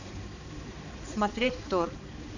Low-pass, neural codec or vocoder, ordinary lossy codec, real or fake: 7.2 kHz; codec, 16 kHz, 4 kbps, X-Codec, HuBERT features, trained on balanced general audio; none; fake